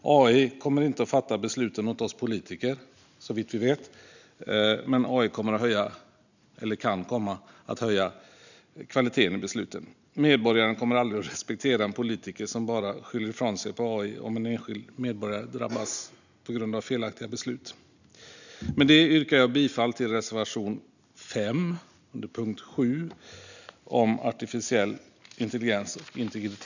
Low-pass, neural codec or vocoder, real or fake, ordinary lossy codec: 7.2 kHz; none; real; none